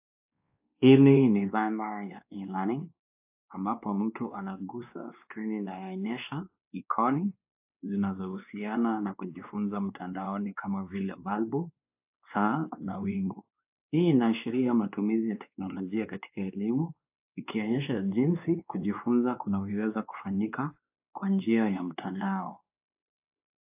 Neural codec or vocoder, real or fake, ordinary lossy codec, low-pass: codec, 16 kHz, 2 kbps, X-Codec, WavLM features, trained on Multilingual LibriSpeech; fake; AAC, 32 kbps; 3.6 kHz